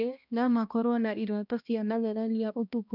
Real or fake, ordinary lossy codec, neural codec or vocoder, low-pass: fake; none; codec, 16 kHz, 1 kbps, X-Codec, HuBERT features, trained on balanced general audio; 5.4 kHz